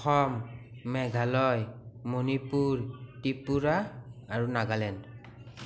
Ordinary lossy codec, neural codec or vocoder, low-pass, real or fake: none; none; none; real